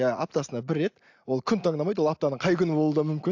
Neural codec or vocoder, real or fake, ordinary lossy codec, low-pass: none; real; AAC, 48 kbps; 7.2 kHz